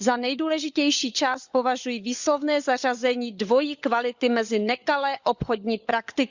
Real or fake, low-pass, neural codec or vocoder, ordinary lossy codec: fake; 7.2 kHz; codec, 16 kHz, 16 kbps, FunCodec, trained on LibriTTS, 50 frames a second; Opus, 64 kbps